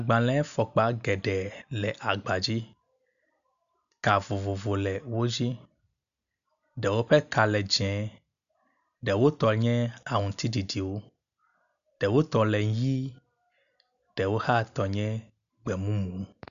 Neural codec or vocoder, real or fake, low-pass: none; real; 7.2 kHz